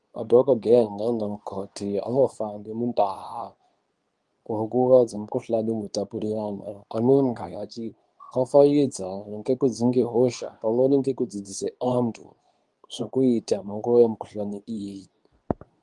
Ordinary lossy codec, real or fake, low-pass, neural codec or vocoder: Opus, 32 kbps; fake; 10.8 kHz; codec, 24 kHz, 0.9 kbps, WavTokenizer, medium speech release version 2